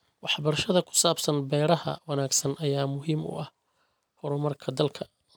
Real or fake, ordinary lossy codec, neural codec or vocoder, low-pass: real; none; none; none